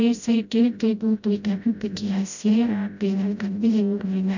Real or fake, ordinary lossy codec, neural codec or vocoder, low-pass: fake; none; codec, 16 kHz, 0.5 kbps, FreqCodec, smaller model; 7.2 kHz